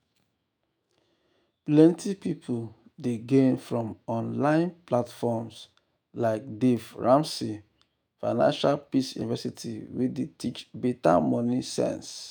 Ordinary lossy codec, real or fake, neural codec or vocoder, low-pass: none; fake; autoencoder, 48 kHz, 128 numbers a frame, DAC-VAE, trained on Japanese speech; none